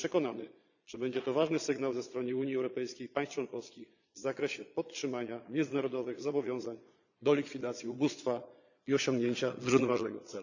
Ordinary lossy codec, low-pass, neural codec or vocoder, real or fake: none; 7.2 kHz; vocoder, 22.05 kHz, 80 mel bands, Vocos; fake